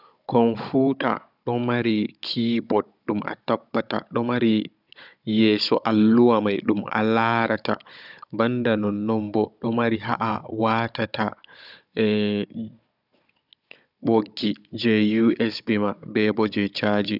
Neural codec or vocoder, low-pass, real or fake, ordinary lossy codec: codec, 16 kHz, 16 kbps, FunCodec, trained on Chinese and English, 50 frames a second; 5.4 kHz; fake; none